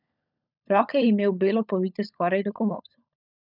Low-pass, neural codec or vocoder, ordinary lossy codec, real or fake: 5.4 kHz; codec, 16 kHz, 16 kbps, FunCodec, trained on LibriTTS, 50 frames a second; none; fake